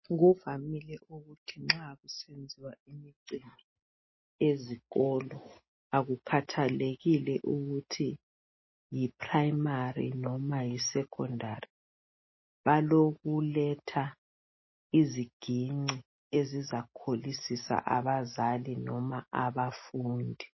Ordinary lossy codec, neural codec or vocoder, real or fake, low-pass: MP3, 24 kbps; none; real; 7.2 kHz